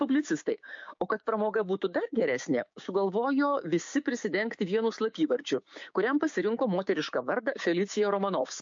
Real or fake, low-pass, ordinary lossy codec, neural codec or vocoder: fake; 7.2 kHz; MP3, 48 kbps; codec, 16 kHz, 6 kbps, DAC